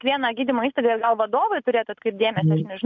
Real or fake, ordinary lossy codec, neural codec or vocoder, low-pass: real; MP3, 64 kbps; none; 7.2 kHz